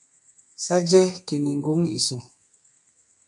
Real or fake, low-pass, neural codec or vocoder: fake; 10.8 kHz; codec, 32 kHz, 1.9 kbps, SNAC